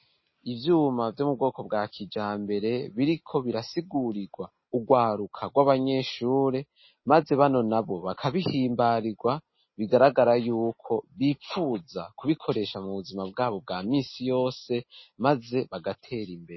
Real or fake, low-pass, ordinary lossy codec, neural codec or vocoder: real; 7.2 kHz; MP3, 24 kbps; none